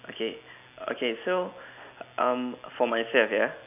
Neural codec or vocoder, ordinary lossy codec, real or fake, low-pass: none; none; real; 3.6 kHz